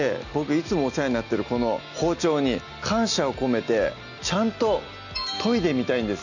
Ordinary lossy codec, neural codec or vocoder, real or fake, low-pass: none; none; real; 7.2 kHz